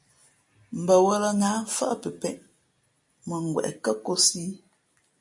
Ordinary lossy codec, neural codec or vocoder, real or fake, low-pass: MP3, 48 kbps; none; real; 10.8 kHz